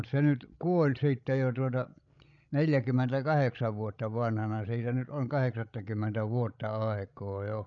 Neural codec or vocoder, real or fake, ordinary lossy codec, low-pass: codec, 16 kHz, 16 kbps, FreqCodec, larger model; fake; MP3, 96 kbps; 7.2 kHz